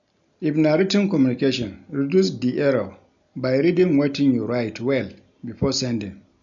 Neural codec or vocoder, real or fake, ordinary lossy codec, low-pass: none; real; none; 7.2 kHz